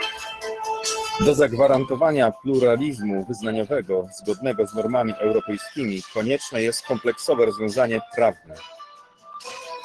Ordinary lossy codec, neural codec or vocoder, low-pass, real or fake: Opus, 16 kbps; codec, 44.1 kHz, 7.8 kbps, DAC; 10.8 kHz; fake